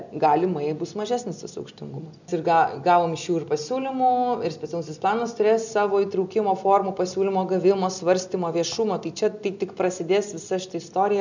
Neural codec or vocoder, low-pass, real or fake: none; 7.2 kHz; real